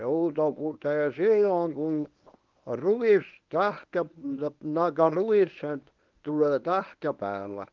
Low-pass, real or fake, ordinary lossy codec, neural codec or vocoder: 7.2 kHz; fake; Opus, 32 kbps; codec, 24 kHz, 0.9 kbps, WavTokenizer, medium speech release version 1